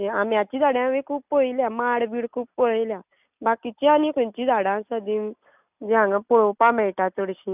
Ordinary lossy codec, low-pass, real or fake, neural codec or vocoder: none; 3.6 kHz; real; none